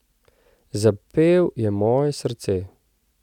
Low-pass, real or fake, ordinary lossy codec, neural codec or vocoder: 19.8 kHz; real; none; none